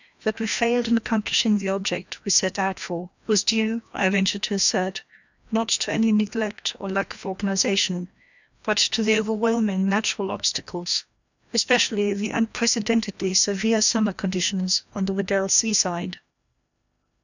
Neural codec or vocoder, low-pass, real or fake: codec, 16 kHz, 1 kbps, FreqCodec, larger model; 7.2 kHz; fake